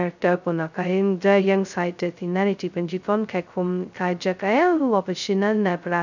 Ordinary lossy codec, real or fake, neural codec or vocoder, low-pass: none; fake; codec, 16 kHz, 0.2 kbps, FocalCodec; 7.2 kHz